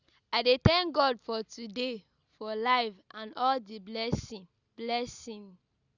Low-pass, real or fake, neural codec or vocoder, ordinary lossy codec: 7.2 kHz; real; none; Opus, 64 kbps